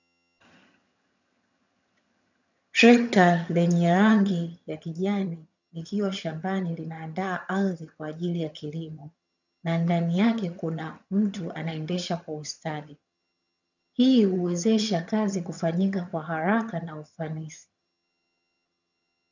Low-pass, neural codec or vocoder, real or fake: 7.2 kHz; vocoder, 22.05 kHz, 80 mel bands, HiFi-GAN; fake